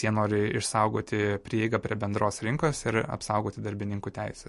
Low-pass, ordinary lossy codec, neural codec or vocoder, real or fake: 14.4 kHz; MP3, 48 kbps; none; real